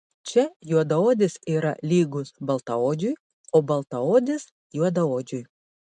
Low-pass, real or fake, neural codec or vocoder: 10.8 kHz; real; none